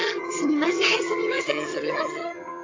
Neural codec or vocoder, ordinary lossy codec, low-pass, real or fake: vocoder, 22.05 kHz, 80 mel bands, HiFi-GAN; AAC, 32 kbps; 7.2 kHz; fake